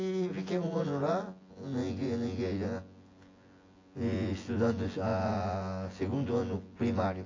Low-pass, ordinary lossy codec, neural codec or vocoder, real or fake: 7.2 kHz; AAC, 32 kbps; vocoder, 24 kHz, 100 mel bands, Vocos; fake